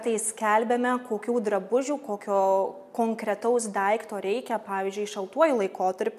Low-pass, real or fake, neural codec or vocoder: 14.4 kHz; real; none